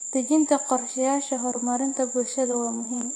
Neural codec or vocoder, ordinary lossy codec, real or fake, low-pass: vocoder, 24 kHz, 100 mel bands, Vocos; AAC, 48 kbps; fake; 9.9 kHz